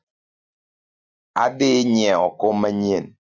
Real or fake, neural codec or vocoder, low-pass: real; none; 7.2 kHz